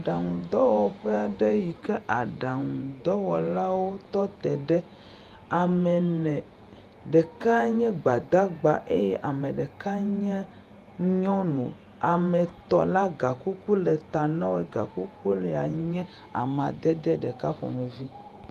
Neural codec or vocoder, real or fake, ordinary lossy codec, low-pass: vocoder, 48 kHz, 128 mel bands, Vocos; fake; Opus, 32 kbps; 14.4 kHz